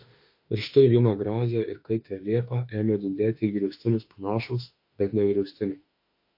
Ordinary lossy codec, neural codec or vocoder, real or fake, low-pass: MP3, 32 kbps; autoencoder, 48 kHz, 32 numbers a frame, DAC-VAE, trained on Japanese speech; fake; 5.4 kHz